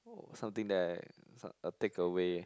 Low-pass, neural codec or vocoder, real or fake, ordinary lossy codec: none; none; real; none